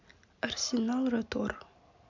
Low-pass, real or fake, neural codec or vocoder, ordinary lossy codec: 7.2 kHz; real; none; MP3, 64 kbps